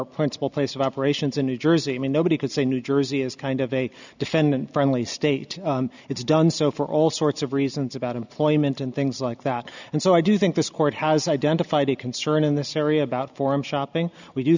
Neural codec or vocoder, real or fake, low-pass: none; real; 7.2 kHz